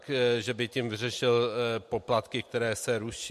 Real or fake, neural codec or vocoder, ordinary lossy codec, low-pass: real; none; MP3, 64 kbps; 14.4 kHz